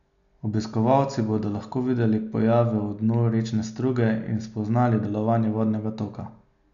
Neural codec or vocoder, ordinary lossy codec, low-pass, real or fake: none; none; 7.2 kHz; real